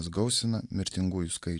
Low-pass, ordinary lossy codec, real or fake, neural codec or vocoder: 10.8 kHz; AAC, 64 kbps; real; none